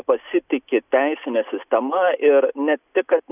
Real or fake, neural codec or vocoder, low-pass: real; none; 3.6 kHz